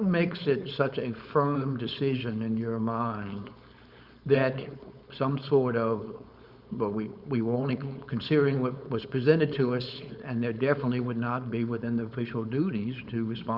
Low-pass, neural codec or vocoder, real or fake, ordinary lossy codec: 5.4 kHz; codec, 16 kHz, 4.8 kbps, FACodec; fake; Opus, 64 kbps